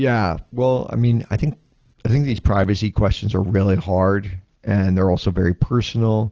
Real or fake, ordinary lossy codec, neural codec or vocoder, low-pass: real; Opus, 16 kbps; none; 7.2 kHz